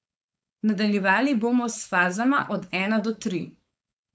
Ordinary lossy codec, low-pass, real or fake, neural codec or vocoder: none; none; fake; codec, 16 kHz, 4.8 kbps, FACodec